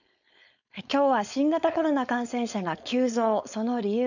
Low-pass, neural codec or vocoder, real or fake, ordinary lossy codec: 7.2 kHz; codec, 16 kHz, 4.8 kbps, FACodec; fake; none